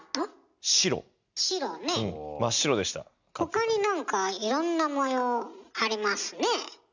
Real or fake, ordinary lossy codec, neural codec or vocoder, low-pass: real; none; none; 7.2 kHz